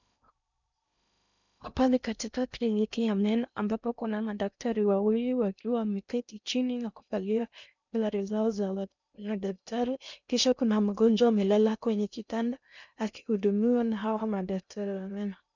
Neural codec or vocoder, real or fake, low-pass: codec, 16 kHz in and 24 kHz out, 0.6 kbps, FocalCodec, streaming, 2048 codes; fake; 7.2 kHz